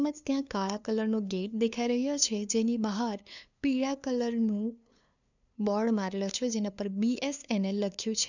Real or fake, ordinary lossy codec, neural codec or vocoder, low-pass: fake; none; codec, 16 kHz, 2 kbps, FunCodec, trained on LibriTTS, 25 frames a second; 7.2 kHz